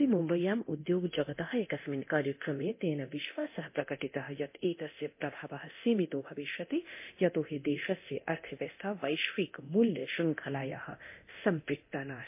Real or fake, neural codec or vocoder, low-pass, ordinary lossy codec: fake; codec, 24 kHz, 0.9 kbps, DualCodec; 3.6 kHz; MP3, 24 kbps